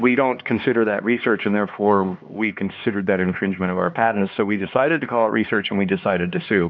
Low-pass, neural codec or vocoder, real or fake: 7.2 kHz; codec, 16 kHz, 2 kbps, X-Codec, HuBERT features, trained on LibriSpeech; fake